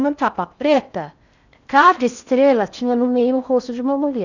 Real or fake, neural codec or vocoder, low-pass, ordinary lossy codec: fake; codec, 16 kHz in and 24 kHz out, 0.6 kbps, FocalCodec, streaming, 4096 codes; 7.2 kHz; none